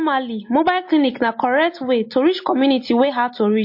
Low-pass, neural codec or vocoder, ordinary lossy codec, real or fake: 5.4 kHz; none; MP3, 32 kbps; real